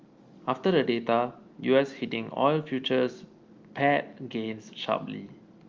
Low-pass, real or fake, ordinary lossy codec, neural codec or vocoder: 7.2 kHz; real; Opus, 32 kbps; none